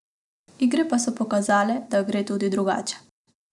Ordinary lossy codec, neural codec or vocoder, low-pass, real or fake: none; none; 10.8 kHz; real